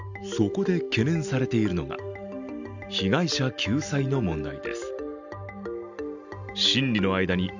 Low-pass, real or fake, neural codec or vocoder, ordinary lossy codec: 7.2 kHz; real; none; none